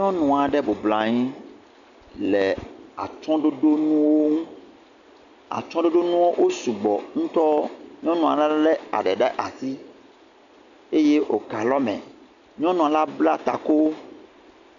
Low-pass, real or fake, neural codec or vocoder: 7.2 kHz; real; none